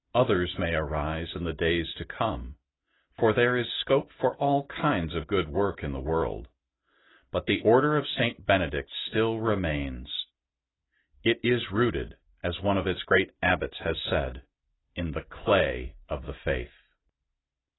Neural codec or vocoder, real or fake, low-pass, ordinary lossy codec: none; real; 7.2 kHz; AAC, 16 kbps